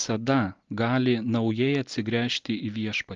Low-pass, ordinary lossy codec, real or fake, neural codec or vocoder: 7.2 kHz; Opus, 32 kbps; real; none